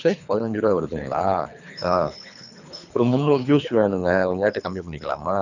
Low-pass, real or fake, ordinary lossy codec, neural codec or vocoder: 7.2 kHz; fake; none; codec, 24 kHz, 3 kbps, HILCodec